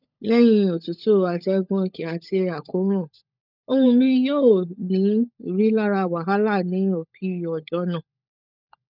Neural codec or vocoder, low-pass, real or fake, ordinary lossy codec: codec, 16 kHz, 16 kbps, FunCodec, trained on LibriTTS, 50 frames a second; 5.4 kHz; fake; none